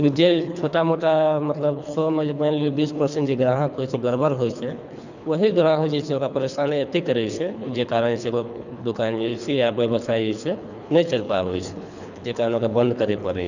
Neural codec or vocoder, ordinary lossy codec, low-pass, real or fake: codec, 24 kHz, 3 kbps, HILCodec; none; 7.2 kHz; fake